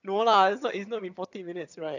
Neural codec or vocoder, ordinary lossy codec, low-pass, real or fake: vocoder, 22.05 kHz, 80 mel bands, HiFi-GAN; none; 7.2 kHz; fake